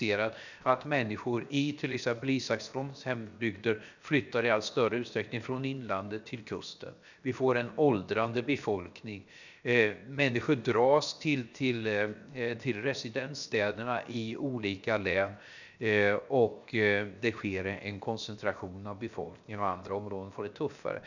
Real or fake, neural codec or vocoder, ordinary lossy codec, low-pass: fake; codec, 16 kHz, 0.7 kbps, FocalCodec; none; 7.2 kHz